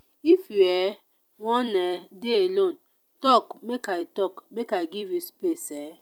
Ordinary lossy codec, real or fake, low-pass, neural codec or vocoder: none; fake; 19.8 kHz; vocoder, 44.1 kHz, 128 mel bands every 256 samples, BigVGAN v2